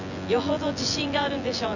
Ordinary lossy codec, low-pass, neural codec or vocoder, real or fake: none; 7.2 kHz; vocoder, 24 kHz, 100 mel bands, Vocos; fake